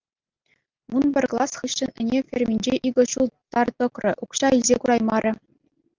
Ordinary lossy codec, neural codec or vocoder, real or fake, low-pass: Opus, 32 kbps; none; real; 7.2 kHz